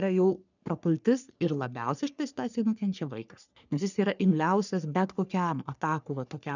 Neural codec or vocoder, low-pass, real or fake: codec, 44.1 kHz, 3.4 kbps, Pupu-Codec; 7.2 kHz; fake